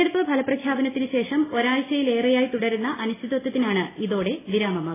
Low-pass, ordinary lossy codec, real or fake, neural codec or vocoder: 3.6 kHz; AAC, 16 kbps; real; none